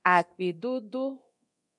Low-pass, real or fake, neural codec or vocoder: 10.8 kHz; fake; codec, 24 kHz, 0.9 kbps, DualCodec